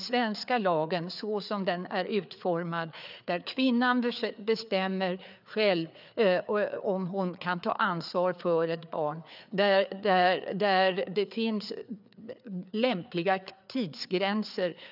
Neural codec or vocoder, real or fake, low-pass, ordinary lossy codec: codec, 16 kHz, 4 kbps, FreqCodec, larger model; fake; 5.4 kHz; none